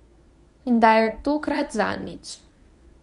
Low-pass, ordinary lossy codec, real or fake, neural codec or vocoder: 10.8 kHz; none; fake; codec, 24 kHz, 0.9 kbps, WavTokenizer, medium speech release version 1